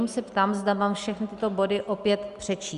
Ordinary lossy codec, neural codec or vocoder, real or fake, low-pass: Opus, 64 kbps; none; real; 10.8 kHz